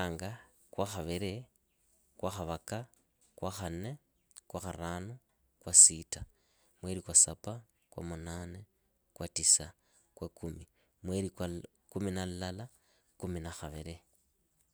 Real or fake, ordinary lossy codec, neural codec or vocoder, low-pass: real; none; none; none